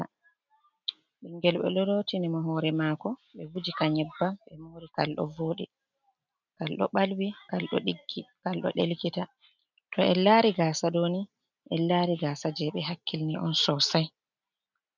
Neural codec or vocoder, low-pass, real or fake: none; 7.2 kHz; real